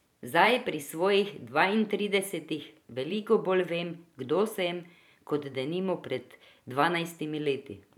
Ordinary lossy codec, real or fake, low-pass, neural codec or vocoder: none; fake; 19.8 kHz; vocoder, 44.1 kHz, 128 mel bands every 512 samples, BigVGAN v2